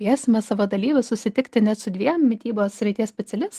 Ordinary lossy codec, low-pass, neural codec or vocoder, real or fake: Opus, 24 kbps; 14.4 kHz; none; real